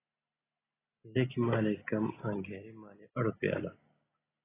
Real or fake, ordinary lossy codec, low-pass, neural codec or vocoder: real; AAC, 16 kbps; 3.6 kHz; none